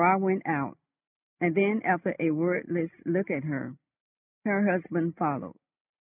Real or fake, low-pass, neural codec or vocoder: real; 3.6 kHz; none